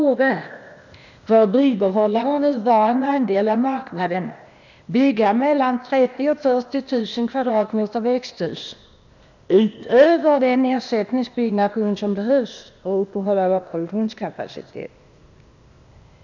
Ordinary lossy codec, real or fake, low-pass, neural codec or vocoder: none; fake; 7.2 kHz; codec, 16 kHz, 0.8 kbps, ZipCodec